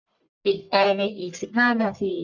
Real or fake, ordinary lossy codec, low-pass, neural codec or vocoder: fake; none; 7.2 kHz; codec, 44.1 kHz, 1.7 kbps, Pupu-Codec